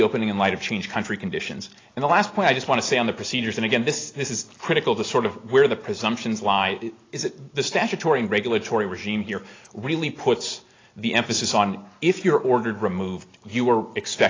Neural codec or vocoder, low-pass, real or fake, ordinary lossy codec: none; 7.2 kHz; real; AAC, 32 kbps